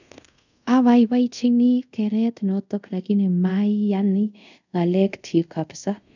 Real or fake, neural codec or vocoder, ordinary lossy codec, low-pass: fake; codec, 24 kHz, 0.5 kbps, DualCodec; none; 7.2 kHz